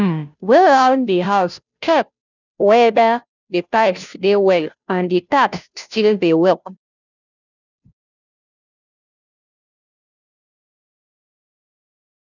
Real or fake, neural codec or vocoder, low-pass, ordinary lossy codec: fake; codec, 16 kHz, 0.5 kbps, FunCodec, trained on Chinese and English, 25 frames a second; 7.2 kHz; none